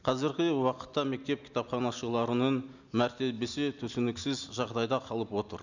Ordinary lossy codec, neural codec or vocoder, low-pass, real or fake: none; none; 7.2 kHz; real